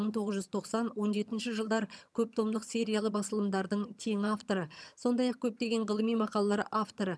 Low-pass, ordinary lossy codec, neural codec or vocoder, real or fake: none; none; vocoder, 22.05 kHz, 80 mel bands, HiFi-GAN; fake